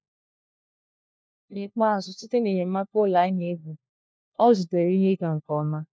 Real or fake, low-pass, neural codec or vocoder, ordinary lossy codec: fake; none; codec, 16 kHz, 1 kbps, FunCodec, trained on LibriTTS, 50 frames a second; none